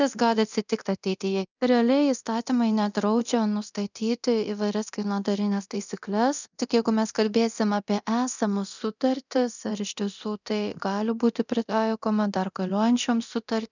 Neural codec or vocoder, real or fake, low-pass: codec, 24 kHz, 0.9 kbps, DualCodec; fake; 7.2 kHz